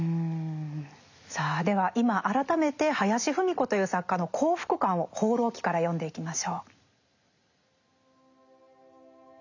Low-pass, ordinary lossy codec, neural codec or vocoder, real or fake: 7.2 kHz; none; none; real